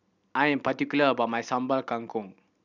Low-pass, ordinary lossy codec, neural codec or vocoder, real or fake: 7.2 kHz; none; none; real